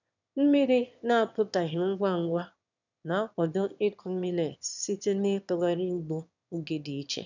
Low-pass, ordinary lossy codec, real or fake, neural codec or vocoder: 7.2 kHz; none; fake; autoencoder, 22.05 kHz, a latent of 192 numbers a frame, VITS, trained on one speaker